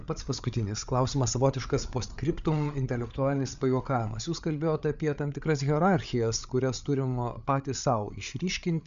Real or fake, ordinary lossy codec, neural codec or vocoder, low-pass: fake; MP3, 96 kbps; codec, 16 kHz, 8 kbps, FreqCodec, larger model; 7.2 kHz